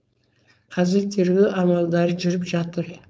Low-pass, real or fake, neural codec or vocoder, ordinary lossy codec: none; fake; codec, 16 kHz, 4.8 kbps, FACodec; none